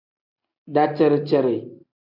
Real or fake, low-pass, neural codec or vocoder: real; 5.4 kHz; none